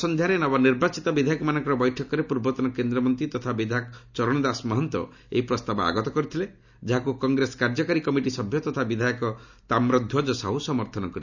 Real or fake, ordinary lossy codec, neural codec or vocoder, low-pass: real; none; none; 7.2 kHz